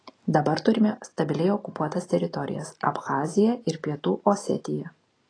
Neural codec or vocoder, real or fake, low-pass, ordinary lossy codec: none; real; 9.9 kHz; AAC, 32 kbps